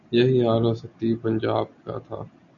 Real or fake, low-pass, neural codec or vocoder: real; 7.2 kHz; none